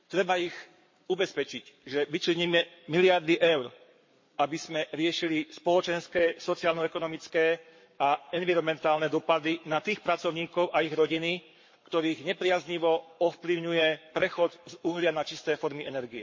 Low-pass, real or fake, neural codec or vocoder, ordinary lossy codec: 7.2 kHz; fake; codec, 44.1 kHz, 7.8 kbps, Pupu-Codec; MP3, 32 kbps